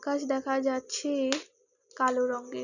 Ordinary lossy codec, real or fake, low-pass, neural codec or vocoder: none; real; 7.2 kHz; none